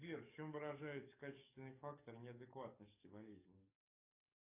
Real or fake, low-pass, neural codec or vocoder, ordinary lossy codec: fake; 3.6 kHz; codec, 44.1 kHz, 7.8 kbps, DAC; AAC, 24 kbps